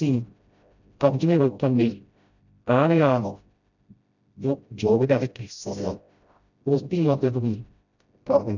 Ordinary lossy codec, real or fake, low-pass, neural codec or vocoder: none; fake; 7.2 kHz; codec, 16 kHz, 0.5 kbps, FreqCodec, smaller model